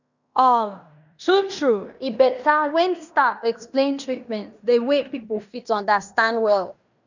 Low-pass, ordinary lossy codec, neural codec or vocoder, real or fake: 7.2 kHz; none; codec, 16 kHz in and 24 kHz out, 0.9 kbps, LongCat-Audio-Codec, fine tuned four codebook decoder; fake